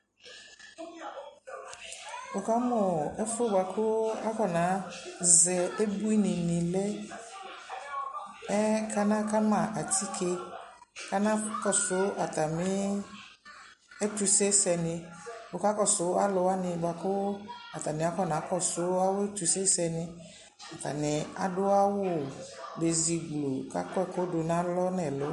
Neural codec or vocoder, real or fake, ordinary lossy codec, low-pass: none; real; MP3, 48 kbps; 14.4 kHz